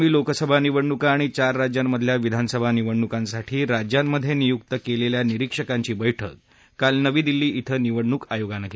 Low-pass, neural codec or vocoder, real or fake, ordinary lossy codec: none; none; real; none